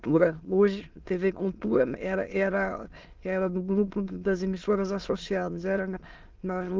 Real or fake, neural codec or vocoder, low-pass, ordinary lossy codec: fake; autoencoder, 22.05 kHz, a latent of 192 numbers a frame, VITS, trained on many speakers; 7.2 kHz; Opus, 16 kbps